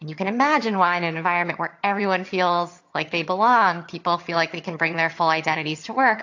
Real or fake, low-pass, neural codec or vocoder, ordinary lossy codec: fake; 7.2 kHz; vocoder, 22.05 kHz, 80 mel bands, HiFi-GAN; AAC, 48 kbps